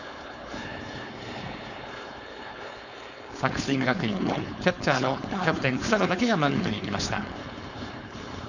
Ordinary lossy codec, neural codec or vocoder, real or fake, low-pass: none; codec, 16 kHz, 4.8 kbps, FACodec; fake; 7.2 kHz